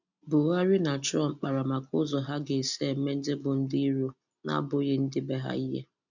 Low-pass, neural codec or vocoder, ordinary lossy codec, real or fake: 7.2 kHz; none; none; real